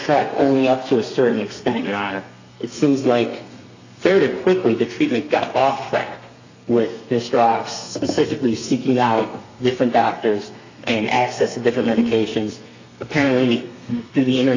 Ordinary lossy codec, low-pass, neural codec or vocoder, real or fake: AAC, 48 kbps; 7.2 kHz; codec, 32 kHz, 1.9 kbps, SNAC; fake